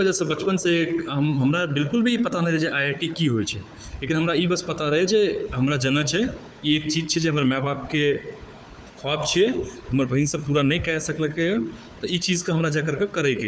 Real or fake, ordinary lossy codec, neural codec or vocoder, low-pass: fake; none; codec, 16 kHz, 4 kbps, FunCodec, trained on Chinese and English, 50 frames a second; none